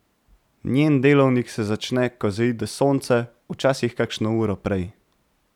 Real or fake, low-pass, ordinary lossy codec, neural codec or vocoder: fake; 19.8 kHz; none; vocoder, 44.1 kHz, 128 mel bands every 512 samples, BigVGAN v2